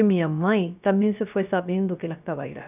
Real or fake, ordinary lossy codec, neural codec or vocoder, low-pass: fake; none; codec, 16 kHz, 0.3 kbps, FocalCodec; 3.6 kHz